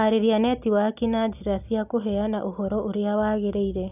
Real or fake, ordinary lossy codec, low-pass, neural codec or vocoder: real; none; 3.6 kHz; none